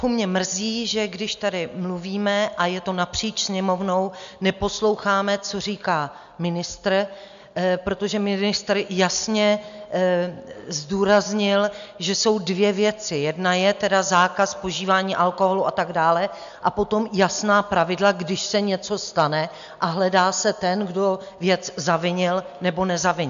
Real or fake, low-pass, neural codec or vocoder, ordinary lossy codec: real; 7.2 kHz; none; MP3, 64 kbps